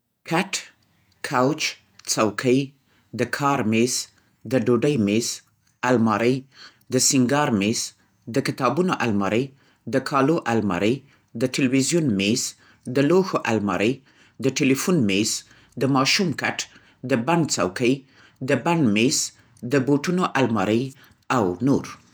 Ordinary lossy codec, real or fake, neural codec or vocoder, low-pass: none; fake; vocoder, 48 kHz, 128 mel bands, Vocos; none